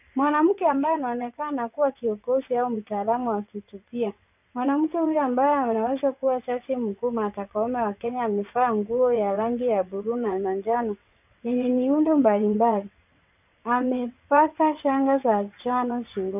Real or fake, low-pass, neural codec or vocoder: fake; 3.6 kHz; vocoder, 22.05 kHz, 80 mel bands, WaveNeXt